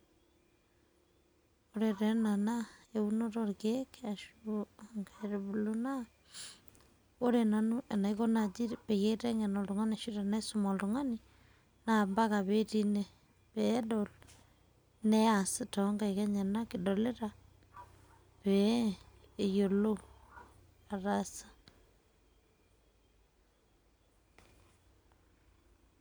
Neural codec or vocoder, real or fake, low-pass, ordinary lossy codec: none; real; none; none